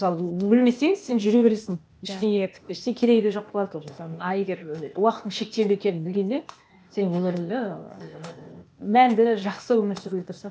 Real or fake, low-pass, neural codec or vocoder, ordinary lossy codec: fake; none; codec, 16 kHz, 0.8 kbps, ZipCodec; none